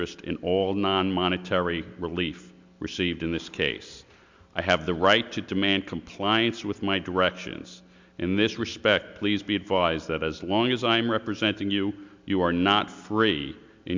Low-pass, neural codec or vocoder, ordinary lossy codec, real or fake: 7.2 kHz; none; MP3, 64 kbps; real